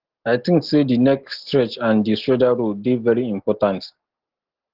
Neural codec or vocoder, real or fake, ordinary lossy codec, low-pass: none; real; Opus, 16 kbps; 5.4 kHz